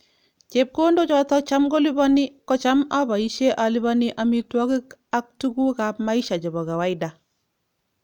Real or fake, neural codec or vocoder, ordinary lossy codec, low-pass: real; none; none; 19.8 kHz